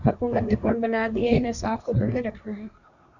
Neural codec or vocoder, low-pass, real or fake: codec, 24 kHz, 1 kbps, SNAC; 7.2 kHz; fake